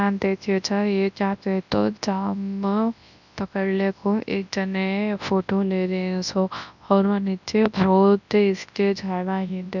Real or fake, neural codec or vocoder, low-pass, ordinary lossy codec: fake; codec, 24 kHz, 0.9 kbps, WavTokenizer, large speech release; 7.2 kHz; none